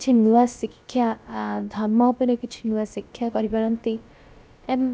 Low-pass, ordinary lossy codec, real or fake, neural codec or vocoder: none; none; fake; codec, 16 kHz, about 1 kbps, DyCAST, with the encoder's durations